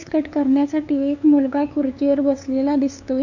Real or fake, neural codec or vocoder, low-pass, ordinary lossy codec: fake; codec, 16 kHz, 2 kbps, FunCodec, trained on LibriTTS, 25 frames a second; 7.2 kHz; none